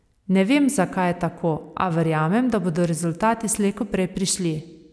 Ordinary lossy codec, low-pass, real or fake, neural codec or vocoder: none; none; real; none